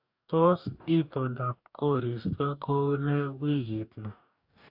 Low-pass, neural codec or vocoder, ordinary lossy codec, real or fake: 5.4 kHz; codec, 44.1 kHz, 2.6 kbps, DAC; AAC, 48 kbps; fake